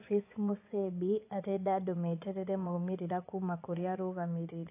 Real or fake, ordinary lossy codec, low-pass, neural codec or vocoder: fake; AAC, 24 kbps; 3.6 kHz; vocoder, 24 kHz, 100 mel bands, Vocos